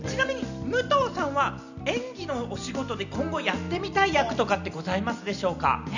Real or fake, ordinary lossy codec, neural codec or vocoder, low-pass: real; none; none; 7.2 kHz